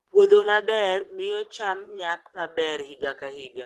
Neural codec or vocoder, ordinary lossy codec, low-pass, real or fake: codec, 44.1 kHz, 3.4 kbps, Pupu-Codec; Opus, 16 kbps; 14.4 kHz; fake